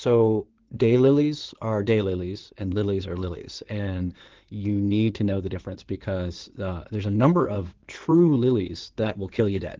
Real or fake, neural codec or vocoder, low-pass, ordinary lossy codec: fake; codec, 16 kHz in and 24 kHz out, 2.2 kbps, FireRedTTS-2 codec; 7.2 kHz; Opus, 16 kbps